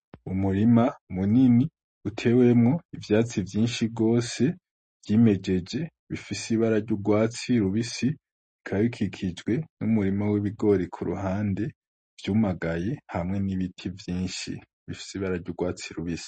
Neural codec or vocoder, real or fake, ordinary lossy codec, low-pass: none; real; MP3, 32 kbps; 10.8 kHz